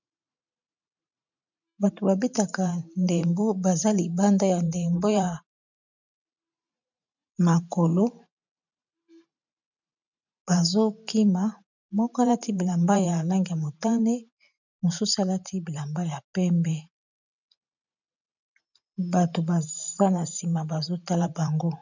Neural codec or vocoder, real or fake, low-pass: vocoder, 44.1 kHz, 128 mel bands every 512 samples, BigVGAN v2; fake; 7.2 kHz